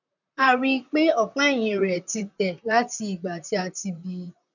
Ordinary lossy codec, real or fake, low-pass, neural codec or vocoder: none; fake; 7.2 kHz; vocoder, 44.1 kHz, 128 mel bands, Pupu-Vocoder